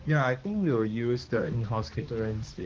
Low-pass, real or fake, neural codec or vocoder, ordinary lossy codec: 7.2 kHz; fake; codec, 16 kHz, 2 kbps, X-Codec, HuBERT features, trained on balanced general audio; Opus, 16 kbps